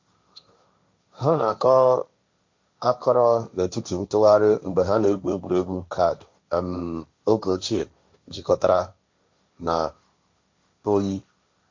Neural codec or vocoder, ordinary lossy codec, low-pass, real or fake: codec, 16 kHz, 1.1 kbps, Voila-Tokenizer; MP3, 48 kbps; 7.2 kHz; fake